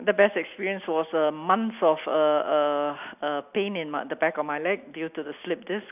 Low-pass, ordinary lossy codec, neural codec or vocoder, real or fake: 3.6 kHz; none; none; real